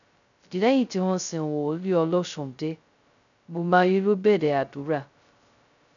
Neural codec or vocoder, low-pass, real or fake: codec, 16 kHz, 0.2 kbps, FocalCodec; 7.2 kHz; fake